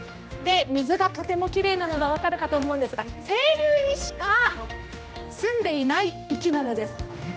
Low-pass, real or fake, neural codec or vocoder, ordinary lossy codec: none; fake; codec, 16 kHz, 1 kbps, X-Codec, HuBERT features, trained on general audio; none